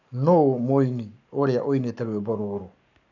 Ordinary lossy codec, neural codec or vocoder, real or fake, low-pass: none; codec, 44.1 kHz, 7.8 kbps, Pupu-Codec; fake; 7.2 kHz